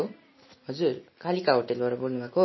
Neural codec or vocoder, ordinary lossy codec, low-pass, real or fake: none; MP3, 24 kbps; 7.2 kHz; real